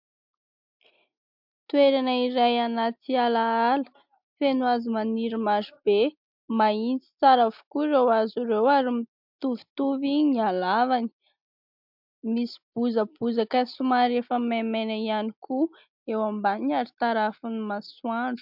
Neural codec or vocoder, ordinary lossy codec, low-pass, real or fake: none; MP3, 48 kbps; 5.4 kHz; real